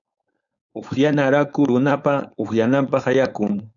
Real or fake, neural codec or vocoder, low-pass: fake; codec, 16 kHz, 4.8 kbps, FACodec; 7.2 kHz